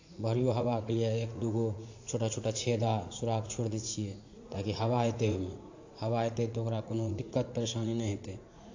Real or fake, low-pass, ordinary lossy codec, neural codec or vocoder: fake; 7.2 kHz; AAC, 48 kbps; vocoder, 44.1 kHz, 80 mel bands, Vocos